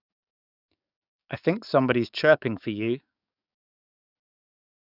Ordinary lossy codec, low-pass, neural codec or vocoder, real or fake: none; 5.4 kHz; codec, 44.1 kHz, 7.8 kbps, DAC; fake